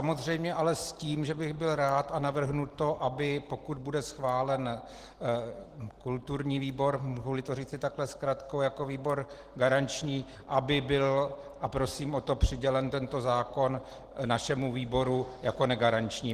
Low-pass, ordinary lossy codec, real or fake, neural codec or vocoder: 14.4 kHz; Opus, 16 kbps; real; none